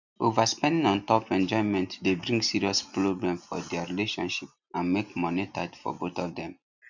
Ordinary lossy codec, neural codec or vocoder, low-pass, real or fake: none; none; 7.2 kHz; real